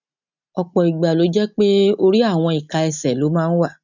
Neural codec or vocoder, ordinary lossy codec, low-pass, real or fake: none; none; 7.2 kHz; real